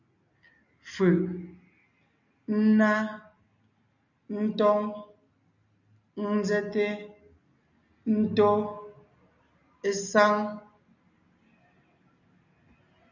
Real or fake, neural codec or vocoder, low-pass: real; none; 7.2 kHz